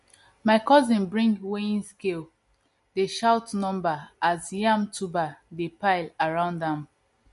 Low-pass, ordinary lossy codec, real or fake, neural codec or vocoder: 14.4 kHz; MP3, 48 kbps; real; none